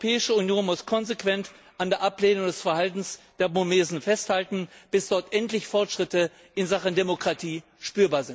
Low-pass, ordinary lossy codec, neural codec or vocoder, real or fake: none; none; none; real